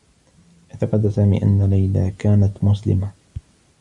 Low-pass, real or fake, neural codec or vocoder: 10.8 kHz; real; none